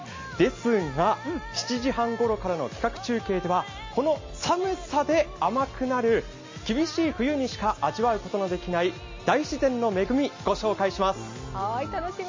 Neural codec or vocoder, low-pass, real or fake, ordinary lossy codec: none; 7.2 kHz; real; MP3, 32 kbps